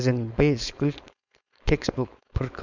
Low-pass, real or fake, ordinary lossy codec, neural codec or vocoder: 7.2 kHz; fake; none; codec, 16 kHz, 4.8 kbps, FACodec